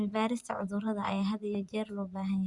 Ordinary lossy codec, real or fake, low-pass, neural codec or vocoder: Opus, 64 kbps; fake; 10.8 kHz; vocoder, 24 kHz, 100 mel bands, Vocos